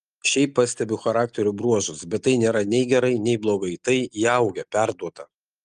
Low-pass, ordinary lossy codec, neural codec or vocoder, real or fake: 9.9 kHz; Opus, 32 kbps; none; real